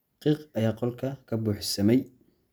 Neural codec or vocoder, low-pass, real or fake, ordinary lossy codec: none; none; real; none